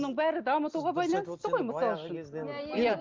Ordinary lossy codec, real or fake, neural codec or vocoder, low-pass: Opus, 24 kbps; real; none; 7.2 kHz